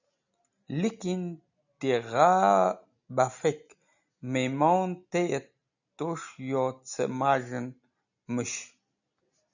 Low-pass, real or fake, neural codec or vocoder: 7.2 kHz; real; none